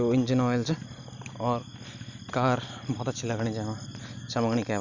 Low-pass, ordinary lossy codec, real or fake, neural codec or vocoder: 7.2 kHz; none; real; none